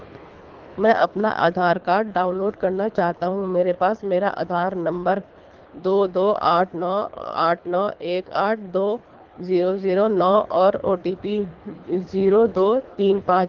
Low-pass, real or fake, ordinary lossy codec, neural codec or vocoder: 7.2 kHz; fake; Opus, 32 kbps; codec, 24 kHz, 3 kbps, HILCodec